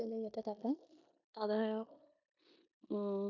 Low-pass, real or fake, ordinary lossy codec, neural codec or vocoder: 7.2 kHz; fake; none; codec, 16 kHz in and 24 kHz out, 0.9 kbps, LongCat-Audio-Codec, four codebook decoder